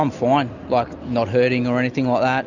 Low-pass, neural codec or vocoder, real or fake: 7.2 kHz; none; real